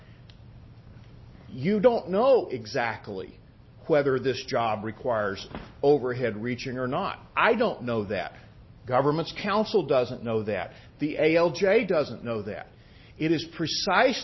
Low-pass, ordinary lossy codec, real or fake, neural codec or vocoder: 7.2 kHz; MP3, 24 kbps; real; none